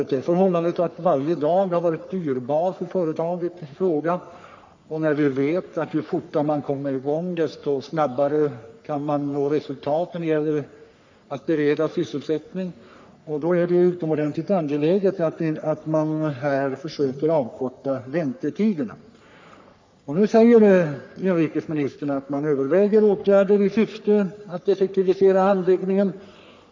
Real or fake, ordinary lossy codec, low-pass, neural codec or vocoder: fake; MP3, 64 kbps; 7.2 kHz; codec, 44.1 kHz, 3.4 kbps, Pupu-Codec